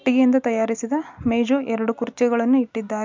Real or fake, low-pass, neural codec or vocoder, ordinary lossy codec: real; 7.2 kHz; none; MP3, 64 kbps